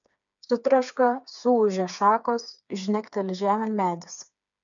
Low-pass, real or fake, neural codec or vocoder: 7.2 kHz; fake; codec, 16 kHz, 4 kbps, FreqCodec, smaller model